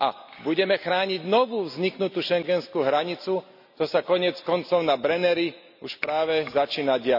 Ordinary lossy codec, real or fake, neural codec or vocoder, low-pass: none; real; none; 5.4 kHz